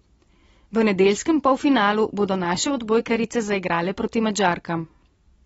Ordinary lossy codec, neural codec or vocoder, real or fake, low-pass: AAC, 24 kbps; none; real; 19.8 kHz